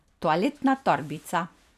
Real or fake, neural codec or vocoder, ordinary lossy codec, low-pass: fake; vocoder, 44.1 kHz, 128 mel bands every 256 samples, BigVGAN v2; none; 14.4 kHz